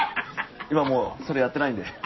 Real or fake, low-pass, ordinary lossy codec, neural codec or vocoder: real; 7.2 kHz; MP3, 24 kbps; none